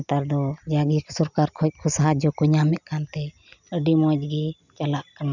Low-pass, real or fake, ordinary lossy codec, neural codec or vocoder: 7.2 kHz; real; none; none